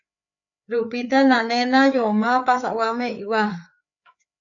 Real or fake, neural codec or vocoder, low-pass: fake; codec, 16 kHz, 4 kbps, FreqCodec, larger model; 7.2 kHz